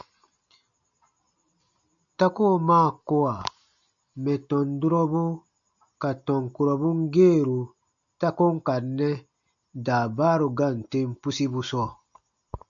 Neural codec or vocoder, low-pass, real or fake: none; 7.2 kHz; real